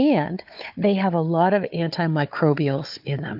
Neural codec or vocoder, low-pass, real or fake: codec, 16 kHz, 4 kbps, FunCodec, trained on Chinese and English, 50 frames a second; 5.4 kHz; fake